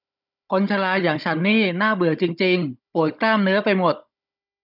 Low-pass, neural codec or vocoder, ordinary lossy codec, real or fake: 5.4 kHz; codec, 16 kHz, 16 kbps, FunCodec, trained on Chinese and English, 50 frames a second; none; fake